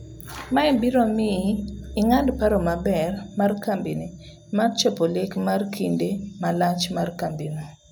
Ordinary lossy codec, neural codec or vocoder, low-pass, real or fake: none; none; none; real